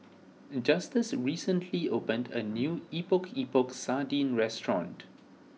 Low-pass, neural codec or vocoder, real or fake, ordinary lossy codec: none; none; real; none